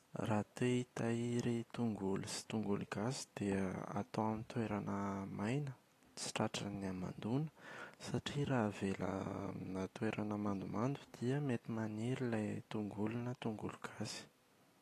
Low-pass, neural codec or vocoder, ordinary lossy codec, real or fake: 14.4 kHz; none; AAC, 48 kbps; real